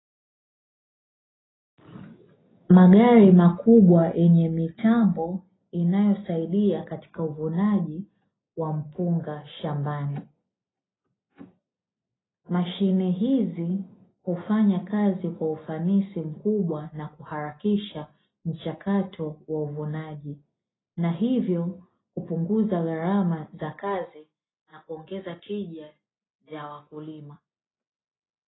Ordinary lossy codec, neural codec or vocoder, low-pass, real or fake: AAC, 16 kbps; none; 7.2 kHz; real